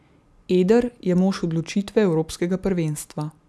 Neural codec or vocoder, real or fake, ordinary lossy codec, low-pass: none; real; none; none